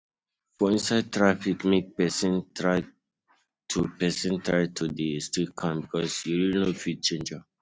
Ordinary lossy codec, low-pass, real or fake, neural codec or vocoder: none; none; real; none